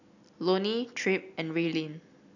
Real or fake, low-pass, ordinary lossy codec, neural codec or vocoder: real; 7.2 kHz; none; none